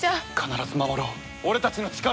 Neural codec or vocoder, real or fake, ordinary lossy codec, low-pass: none; real; none; none